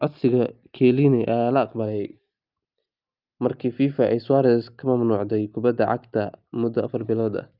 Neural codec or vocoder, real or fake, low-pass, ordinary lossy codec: none; real; 5.4 kHz; Opus, 24 kbps